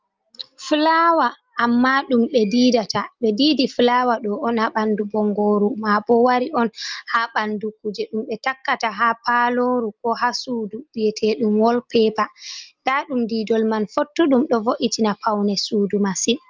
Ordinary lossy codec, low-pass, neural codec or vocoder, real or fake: Opus, 24 kbps; 7.2 kHz; none; real